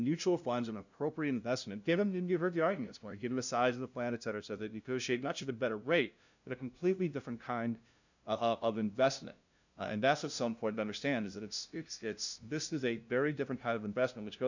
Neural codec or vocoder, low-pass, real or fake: codec, 16 kHz, 0.5 kbps, FunCodec, trained on LibriTTS, 25 frames a second; 7.2 kHz; fake